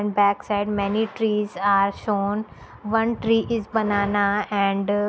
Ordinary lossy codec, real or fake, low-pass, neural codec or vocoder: none; real; none; none